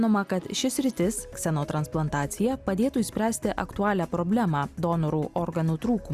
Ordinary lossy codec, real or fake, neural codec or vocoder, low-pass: Opus, 64 kbps; real; none; 14.4 kHz